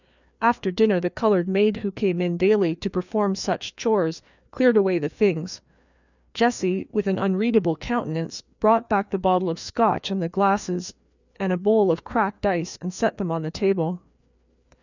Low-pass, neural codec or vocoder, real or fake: 7.2 kHz; codec, 16 kHz, 2 kbps, FreqCodec, larger model; fake